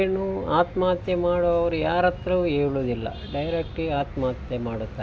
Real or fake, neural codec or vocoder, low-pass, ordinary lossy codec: real; none; none; none